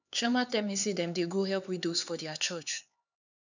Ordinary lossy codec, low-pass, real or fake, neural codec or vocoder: none; 7.2 kHz; fake; codec, 16 kHz, 4 kbps, X-Codec, HuBERT features, trained on LibriSpeech